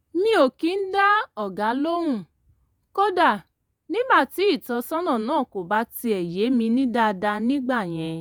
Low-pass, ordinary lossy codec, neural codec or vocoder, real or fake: none; none; vocoder, 48 kHz, 128 mel bands, Vocos; fake